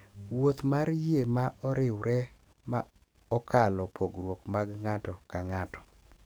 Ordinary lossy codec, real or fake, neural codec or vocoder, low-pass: none; fake; codec, 44.1 kHz, 7.8 kbps, DAC; none